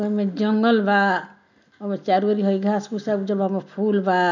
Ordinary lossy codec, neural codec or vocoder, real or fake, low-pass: none; none; real; 7.2 kHz